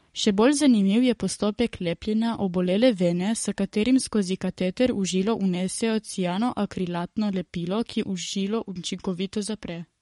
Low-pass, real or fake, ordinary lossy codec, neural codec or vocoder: 19.8 kHz; fake; MP3, 48 kbps; codec, 44.1 kHz, 7.8 kbps, Pupu-Codec